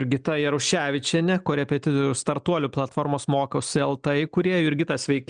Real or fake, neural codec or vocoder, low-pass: real; none; 9.9 kHz